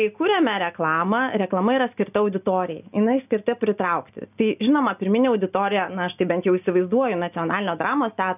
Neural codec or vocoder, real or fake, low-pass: none; real; 3.6 kHz